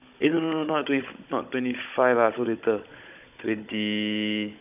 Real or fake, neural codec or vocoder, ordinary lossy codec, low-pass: fake; codec, 16 kHz, 16 kbps, FunCodec, trained on Chinese and English, 50 frames a second; none; 3.6 kHz